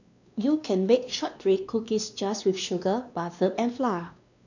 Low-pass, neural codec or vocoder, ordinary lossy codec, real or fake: 7.2 kHz; codec, 16 kHz, 2 kbps, X-Codec, WavLM features, trained on Multilingual LibriSpeech; none; fake